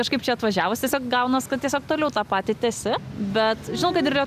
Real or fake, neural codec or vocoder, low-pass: real; none; 14.4 kHz